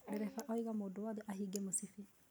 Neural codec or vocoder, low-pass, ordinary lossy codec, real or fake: none; none; none; real